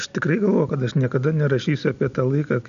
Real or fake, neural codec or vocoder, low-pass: real; none; 7.2 kHz